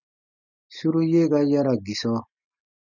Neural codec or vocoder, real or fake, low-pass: none; real; 7.2 kHz